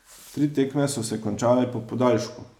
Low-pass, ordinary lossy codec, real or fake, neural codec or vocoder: 19.8 kHz; none; real; none